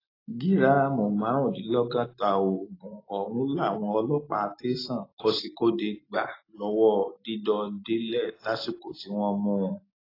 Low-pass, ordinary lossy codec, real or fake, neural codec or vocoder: 5.4 kHz; AAC, 24 kbps; real; none